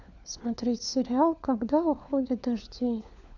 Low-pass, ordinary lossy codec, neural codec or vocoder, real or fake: 7.2 kHz; none; codec, 16 kHz, 4 kbps, FunCodec, trained on LibriTTS, 50 frames a second; fake